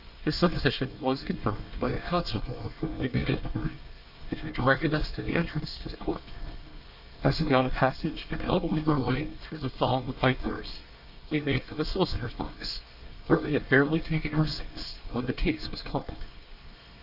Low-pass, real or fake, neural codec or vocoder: 5.4 kHz; fake; codec, 24 kHz, 1 kbps, SNAC